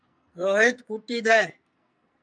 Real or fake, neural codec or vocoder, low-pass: fake; codec, 44.1 kHz, 2.6 kbps, SNAC; 9.9 kHz